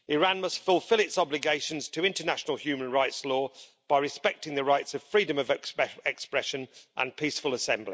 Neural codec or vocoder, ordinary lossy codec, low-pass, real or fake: none; none; none; real